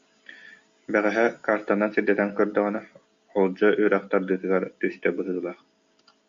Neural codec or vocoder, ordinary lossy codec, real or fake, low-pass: none; MP3, 48 kbps; real; 7.2 kHz